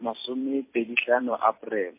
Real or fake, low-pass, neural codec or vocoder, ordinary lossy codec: fake; 3.6 kHz; vocoder, 44.1 kHz, 128 mel bands every 256 samples, BigVGAN v2; MP3, 24 kbps